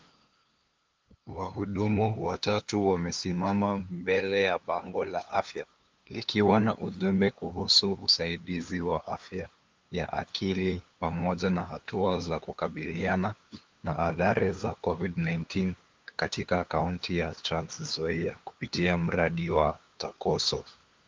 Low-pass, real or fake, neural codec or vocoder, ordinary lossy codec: 7.2 kHz; fake; codec, 16 kHz, 2 kbps, FunCodec, trained on LibriTTS, 25 frames a second; Opus, 32 kbps